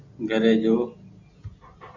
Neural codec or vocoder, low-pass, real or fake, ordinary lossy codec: none; 7.2 kHz; real; Opus, 64 kbps